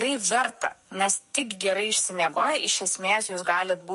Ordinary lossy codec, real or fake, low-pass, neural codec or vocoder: MP3, 48 kbps; fake; 14.4 kHz; codec, 44.1 kHz, 2.6 kbps, SNAC